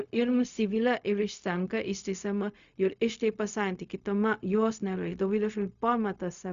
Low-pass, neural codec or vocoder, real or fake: 7.2 kHz; codec, 16 kHz, 0.4 kbps, LongCat-Audio-Codec; fake